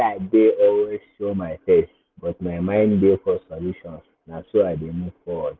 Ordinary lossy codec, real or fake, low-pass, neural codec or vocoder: Opus, 24 kbps; real; 7.2 kHz; none